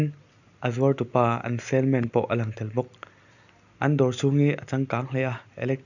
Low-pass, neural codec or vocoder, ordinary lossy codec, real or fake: 7.2 kHz; none; none; real